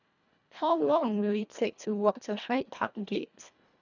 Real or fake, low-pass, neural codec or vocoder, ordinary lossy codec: fake; 7.2 kHz; codec, 24 kHz, 1.5 kbps, HILCodec; none